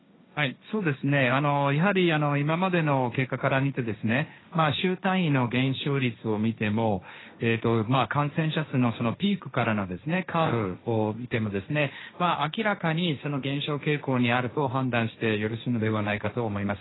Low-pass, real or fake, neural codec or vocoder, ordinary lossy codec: 7.2 kHz; fake; codec, 16 kHz, 1.1 kbps, Voila-Tokenizer; AAC, 16 kbps